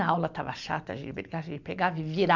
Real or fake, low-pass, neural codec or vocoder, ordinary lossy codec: real; 7.2 kHz; none; none